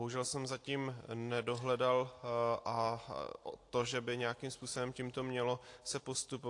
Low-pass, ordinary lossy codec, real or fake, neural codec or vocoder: 10.8 kHz; AAC, 48 kbps; real; none